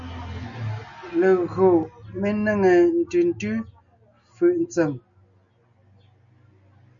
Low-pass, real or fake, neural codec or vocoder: 7.2 kHz; real; none